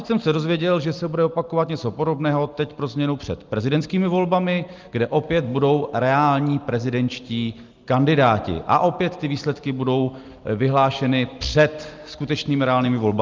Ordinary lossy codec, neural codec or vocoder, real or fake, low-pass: Opus, 32 kbps; none; real; 7.2 kHz